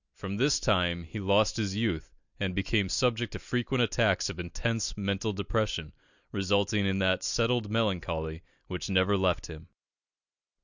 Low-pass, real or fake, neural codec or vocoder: 7.2 kHz; real; none